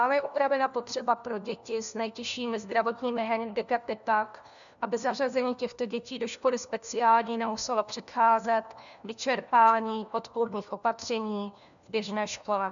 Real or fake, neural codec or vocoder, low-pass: fake; codec, 16 kHz, 1 kbps, FunCodec, trained on LibriTTS, 50 frames a second; 7.2 kHz